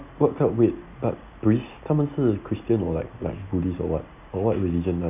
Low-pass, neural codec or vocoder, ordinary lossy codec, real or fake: 3.6 kHz; none; none; real